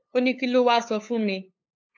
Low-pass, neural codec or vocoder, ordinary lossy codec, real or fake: 7.2 kHz; codec, 16 kHz, 8 kbps, FunCodec, trained on LibriTTS, 25 frames a second; AAC, 48 kbps; fake